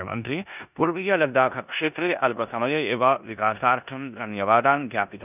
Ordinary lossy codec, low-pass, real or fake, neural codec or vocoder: none; 3.6 kHz; fake; codec, 16 kHz in and 24 kHz out, 0.9 kbps, LongCat-Audio-Codec, four codebook decoder